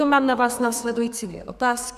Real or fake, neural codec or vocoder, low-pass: fake; codec, 32 kHz, 1.9 kbps, SNAC; 14.4 kHz